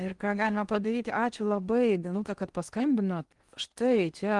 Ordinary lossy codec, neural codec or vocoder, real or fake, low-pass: Opus, 24 kbps; codec, 16 kHz in and 24 kHz out, 0.8 kbps, FocalCodec, streaming, 65536 codes; fake; 10.8 kHz